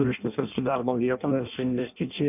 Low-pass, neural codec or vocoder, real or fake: 3.6 kHz; codec, 16 kHz in and 24 kHz out, 0.6 kbps, FireRedTTS-2 codec; fake